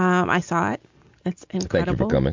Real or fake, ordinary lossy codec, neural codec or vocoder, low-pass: real; MP3, 64 kbps; none; 7.2 kHz